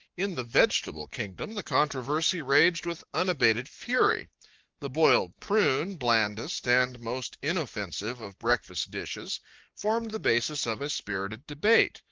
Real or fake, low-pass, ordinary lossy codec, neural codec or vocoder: fake; 7.2 kHz; Opus, 32 kbps; codec, 44.1 kHz, 7.8 kbps, Pupu-Codec